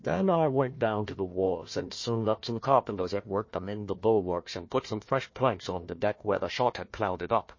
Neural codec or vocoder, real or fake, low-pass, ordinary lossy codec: codec, 16 kHz, 1 kbps, FunCodec, trained on Chinese and English, 50 frames a second; fake; 7.2 kHz; MP3, 32 kbps